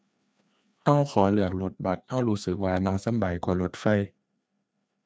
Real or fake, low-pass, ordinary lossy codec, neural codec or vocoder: fake; none; none; codec, 16 kHz, 2 kbps, FreqCodec, larger model